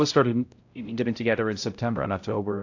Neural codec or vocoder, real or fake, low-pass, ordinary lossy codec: codec, 16 kHz, 0.5 kbps, X-Codec, HuBERT features, trained on balanced general audio; fake; 7.2 kHz; AAC, 48 kbps